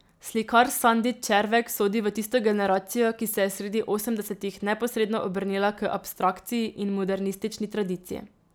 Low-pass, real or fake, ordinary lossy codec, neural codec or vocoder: none; real; none; none